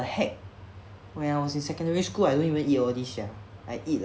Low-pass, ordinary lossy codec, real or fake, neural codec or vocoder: none; none; real; none